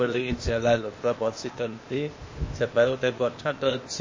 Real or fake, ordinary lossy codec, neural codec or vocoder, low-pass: fake; MP3, 32 kbps; codec, 16 kHz, 0.8 kbps, ZipCodec; 7.2 kHz